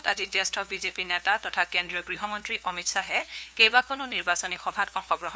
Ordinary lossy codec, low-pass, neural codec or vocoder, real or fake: none; none; codec, 16 kHz, 2 kbps, FunCodec, trained on LibriTTS, 25 frames a second; fake